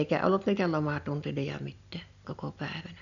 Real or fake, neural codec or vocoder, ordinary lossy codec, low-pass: real; none; none; 7.2 kHz